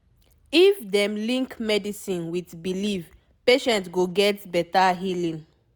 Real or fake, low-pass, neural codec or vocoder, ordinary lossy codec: real; none; none; none